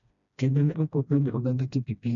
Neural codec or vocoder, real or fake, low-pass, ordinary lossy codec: codec, 16 kHz, 1 kbps, FreqCodec, smaller model; fake; 7.2 kHz; none